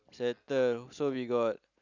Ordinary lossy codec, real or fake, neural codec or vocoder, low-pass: none; real; none; 7.2 kHz